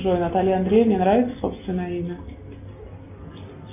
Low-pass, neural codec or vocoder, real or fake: 3.6 kHz; none; real